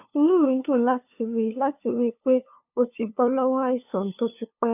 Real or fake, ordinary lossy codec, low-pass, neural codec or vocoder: fake; none; 3.6 kHz; codec, 16 kHz, 2 kbps, FunCodec, trained on LibriTTS, 25 frames a second